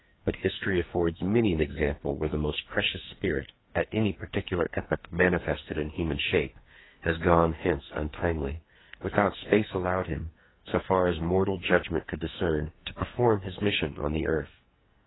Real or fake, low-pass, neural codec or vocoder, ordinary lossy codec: fake; 7.2 kHz; codec, 44.1 kHz, 2.6 kbps, SNAC; AAC, 16 kbps